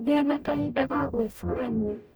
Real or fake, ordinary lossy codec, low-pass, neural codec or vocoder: fake; none; none; codec, 44.1 kHz, 0.9 kbps, DAC